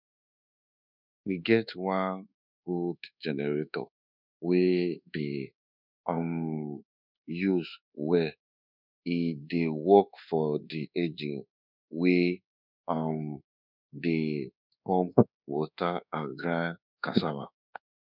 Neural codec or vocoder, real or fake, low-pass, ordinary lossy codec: codec, 24 kHz, 1.2 kbps, DualCodec; fake; 5.4 kHz; none